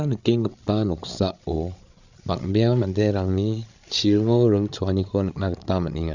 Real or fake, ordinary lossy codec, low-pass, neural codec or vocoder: fake; none; 7.2 kHz; codec, 16 kHz, 8 kbps, FreqCodec, larger model